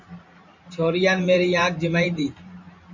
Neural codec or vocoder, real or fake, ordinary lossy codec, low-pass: vocoder, 44.1 kHz, 128 mel bands every 512 samples, BigVGAN v2; fake; MP3, 64 kbps; 7.2 kHz